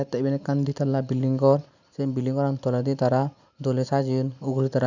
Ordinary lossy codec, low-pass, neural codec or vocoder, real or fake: none; 7.2 kHz; none; real